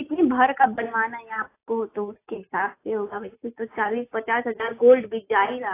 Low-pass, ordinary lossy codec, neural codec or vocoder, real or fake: 3.6 kHz; AAC, 24 kbps; none; real